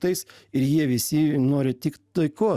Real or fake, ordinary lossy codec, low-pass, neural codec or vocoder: real; Opus, 64 kbps; 14.4 kHz; none